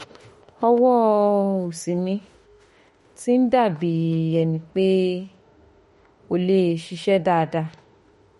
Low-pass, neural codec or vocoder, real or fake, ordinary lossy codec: 19.8 kHz; autoencoder, 48 kHz, 32 numbers a frame, DAC-VAE, trained on Japanese speech; fake; MP3, 48 kbps